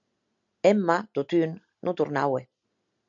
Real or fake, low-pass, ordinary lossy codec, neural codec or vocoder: real; 7.2 kHz; MP3, 64 kbps; none